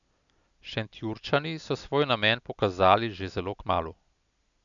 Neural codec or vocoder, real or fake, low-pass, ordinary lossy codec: none; real; 7.2 kHz; none